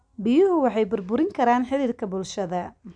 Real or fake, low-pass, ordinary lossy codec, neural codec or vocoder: real; 9.9 kHz; none; none